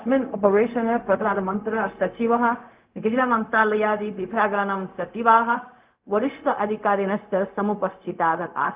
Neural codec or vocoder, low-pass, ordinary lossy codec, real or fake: codec, 16 kHz, 0.4 kbps, LongCat-Audio-Codec; 3.6 kHz; Opus, 32 kbps; fake